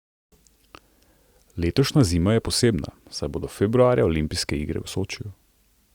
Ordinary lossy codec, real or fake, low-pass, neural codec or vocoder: Opus, 64 kbps; real; 19.8 kHz; none